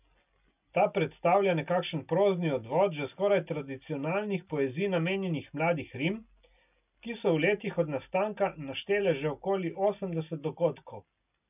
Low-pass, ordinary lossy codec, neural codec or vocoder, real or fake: 3.6 kHz; none; none; real